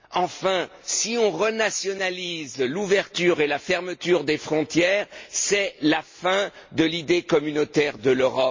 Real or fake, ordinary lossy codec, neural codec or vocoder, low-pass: real; MP3, 32 kbps; none; 7.2 kHz